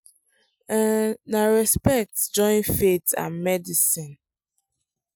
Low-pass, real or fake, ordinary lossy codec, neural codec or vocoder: none; real; none; none